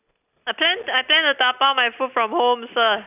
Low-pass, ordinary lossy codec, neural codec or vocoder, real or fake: 3.6 kHz; none; none; real